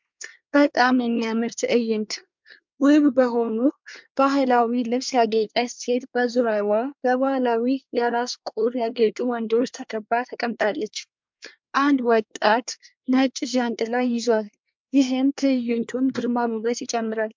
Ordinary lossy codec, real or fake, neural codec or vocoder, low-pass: MP3, 64 kbps; fake; codec, 24 kHz, 1 kbps, SNAC; 7.2 kHz